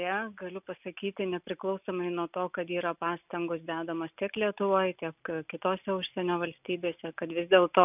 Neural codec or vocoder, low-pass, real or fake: none; 3.6 kHz; real